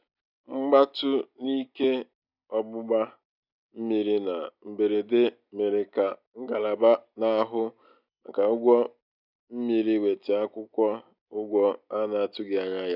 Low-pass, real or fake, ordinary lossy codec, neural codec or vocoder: 5.4 kHz; real; none; none